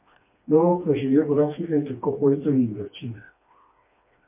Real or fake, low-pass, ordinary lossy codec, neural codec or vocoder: fake; 3.6 kHz; MP3, 24 kbps; codec, 16 kHz, 2 kbps, FreqCodec, smaller model